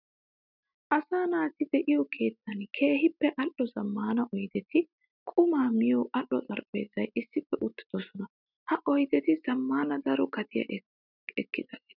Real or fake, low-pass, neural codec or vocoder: real; 5.4 kHz; none